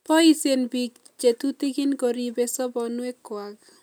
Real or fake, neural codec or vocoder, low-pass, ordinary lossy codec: real; none; none; none